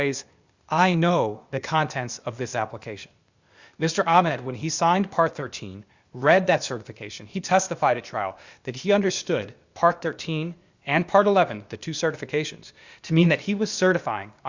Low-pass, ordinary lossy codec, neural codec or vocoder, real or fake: 7.2 kHz; Opus, 64 kbps; codec, 16 kHz, 0.8 kbps, ZipCodec; fake